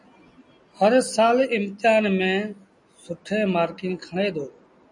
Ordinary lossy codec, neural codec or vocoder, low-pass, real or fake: MP3, 96 kbps; none; 10.8 kHz; real